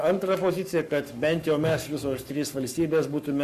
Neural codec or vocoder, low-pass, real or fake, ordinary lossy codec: codec, 44.1 kHz, 7.8 kbps, Pupu-Codec; 14.4 kHz; fake; Opus, 64 kbps